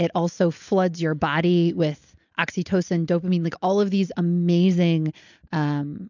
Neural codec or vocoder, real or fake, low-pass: none; real; 7.2 kHz